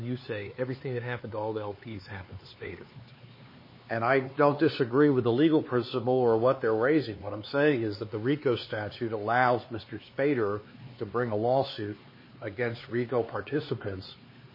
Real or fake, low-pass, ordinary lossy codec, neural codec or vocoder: fake; 5.4 kHz; MP3, 24 kbps; codec, 16 kHz, 4 kbps, X-Codec, HuBERT features, trained on LibriSpeech